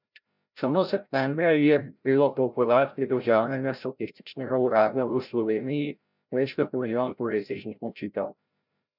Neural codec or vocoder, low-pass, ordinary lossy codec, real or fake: codec, 16 kHz, 0.5 kbps, FreqCodec, larger model; 5.4 kHz; none; fake